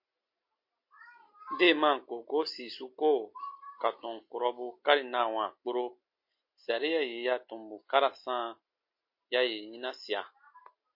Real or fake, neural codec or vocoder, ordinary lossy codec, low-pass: real; none; MP3, 32 kbps; 5.4 kHz